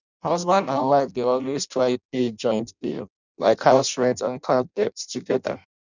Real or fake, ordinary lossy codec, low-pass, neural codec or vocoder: fake; none; 7.2 kHz; codec, 16 kHz in and 24 kHz out, 0.6 kbps, FireRedTTS-2 codec